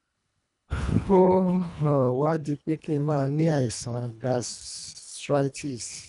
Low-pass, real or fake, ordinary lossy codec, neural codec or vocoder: 10.8 kHz; fake; none; codec, 24 kHz, 1.5 kbps, HILCodec